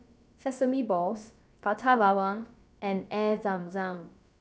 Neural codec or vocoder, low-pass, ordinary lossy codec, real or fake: codec, 16 kHz, about 1 kbps, DyCAST, with the encoder's durations; none; none; fake